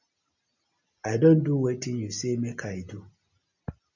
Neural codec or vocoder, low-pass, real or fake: none; 7.2 kHz; real